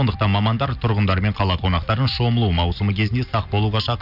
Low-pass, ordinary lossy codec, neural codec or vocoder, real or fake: 5.4 kHz; none; none; real